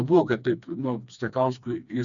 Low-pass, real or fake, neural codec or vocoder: 7.2 kHz; fake; codec, 16 kHz, 2 kbps, FreqCodec, smaller model